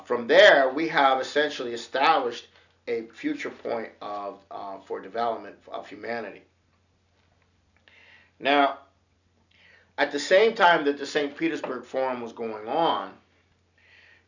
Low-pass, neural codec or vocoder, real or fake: 7.2 kHz; none; real